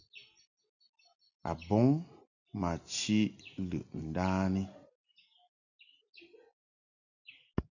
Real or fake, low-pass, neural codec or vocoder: real; 7.2 kHz; none